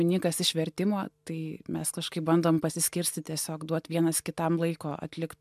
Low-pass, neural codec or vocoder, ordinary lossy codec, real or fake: 14.4 kHz; none; MP3, 96 kbps; real